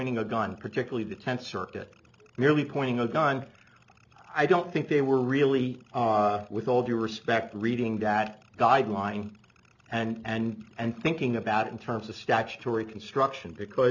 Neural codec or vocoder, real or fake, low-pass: none; real; 7.2 kHz